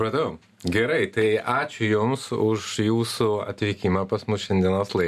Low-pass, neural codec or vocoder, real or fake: 14.4 kHz; none; real